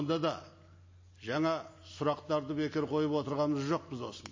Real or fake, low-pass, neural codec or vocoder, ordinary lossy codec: real; 7.2 kHz; none; MP3, 32 kbps